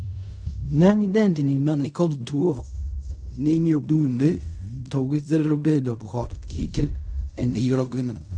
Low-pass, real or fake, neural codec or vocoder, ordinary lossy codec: 9.9 kHz; fake; codec, 16 kHz in and 24 kHz out, 0.4 kbps, LongCat-Audio-Codec, fine tuned four codebook decoder; none